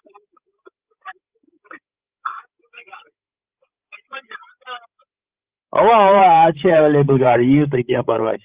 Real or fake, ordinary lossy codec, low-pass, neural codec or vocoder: fake; Opus, 24 kbps; 3.6 kHz; codec, 16 kHz, 16 kbps, FreqCodec, larger model